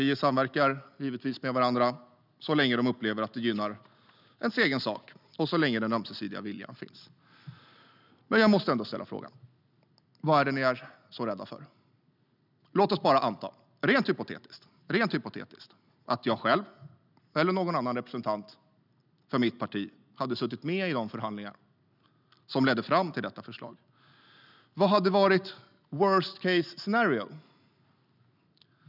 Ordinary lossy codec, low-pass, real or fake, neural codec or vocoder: none; 5.4 kHz; real; none